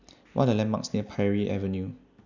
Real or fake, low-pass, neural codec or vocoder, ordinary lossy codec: real; 7.2 kHz; none; none